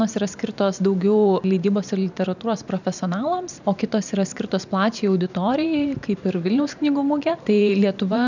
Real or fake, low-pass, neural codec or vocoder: fake; 7.2 kHz; vocoder, 44.1 kHz, 128 mel bands every 512 samples, BigVGAN v2